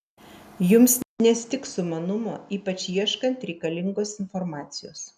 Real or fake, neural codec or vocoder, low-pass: real; none; 14.4 kHz